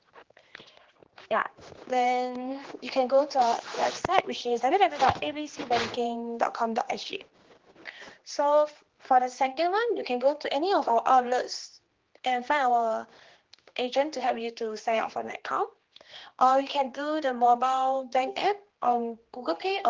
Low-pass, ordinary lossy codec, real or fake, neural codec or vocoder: 7.2 kHz; Opus, 16 kbps; fake; codec, 16 kHz, 2 kbps, X-Codec, HuBERT features, trained on general audio